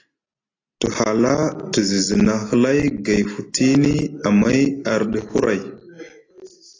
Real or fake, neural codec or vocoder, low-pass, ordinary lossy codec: real; none; 7.2 kHz; AAC, 32 kbps